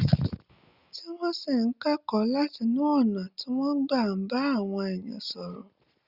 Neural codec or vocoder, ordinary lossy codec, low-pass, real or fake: none; Opus, 64 kbps; 5.4 kHz; real